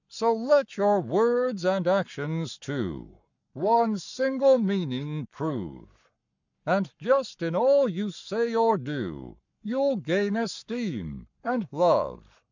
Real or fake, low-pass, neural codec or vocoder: fake; 7.2 kHz; codec, 44.1 kHz, 7.8 kbps, Pupu-Codec